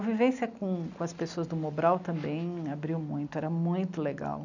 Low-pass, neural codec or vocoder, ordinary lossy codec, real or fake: 7.2 kHz; none; none; real